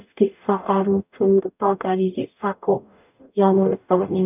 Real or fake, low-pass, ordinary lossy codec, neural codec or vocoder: fake; 3.6 kHz; none; codec, 44.1 kHz, 0.9 kbps, DAC